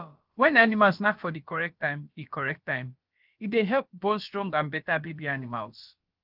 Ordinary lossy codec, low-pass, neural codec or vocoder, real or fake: Opus, 24 kbps; 5.4 kHz; codec, 16 kHz, about 1 kbps, DyCAST, with the encoder's durations; fake